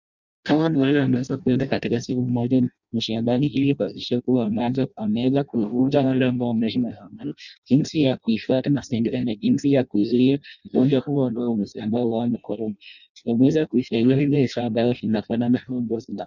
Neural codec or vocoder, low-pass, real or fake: codec, 16 kHz in and 24 kHz out, 0.6 kbps, FireRedTTS-2 codec; 7.2 kHz; fake